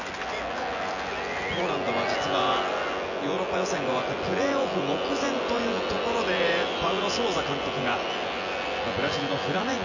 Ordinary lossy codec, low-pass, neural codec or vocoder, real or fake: none; 7.2 kHz; vocoder, 24 kHz, 100 mel bands, Vocos; fake